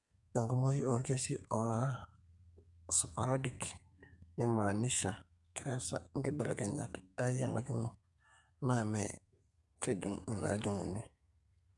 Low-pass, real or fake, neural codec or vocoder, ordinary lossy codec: 10.8 kHz; fake; codec, 32 kHz, 1.9 kbps, SNAC; none